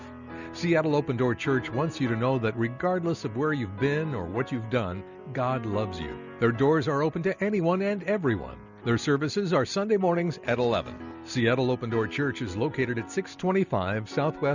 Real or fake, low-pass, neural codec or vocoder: real; 7.2 kHz; none